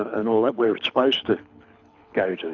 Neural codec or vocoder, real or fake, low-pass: codec, 24 kHz, 6 kbps, HILCodec; fake; 7.2 kHz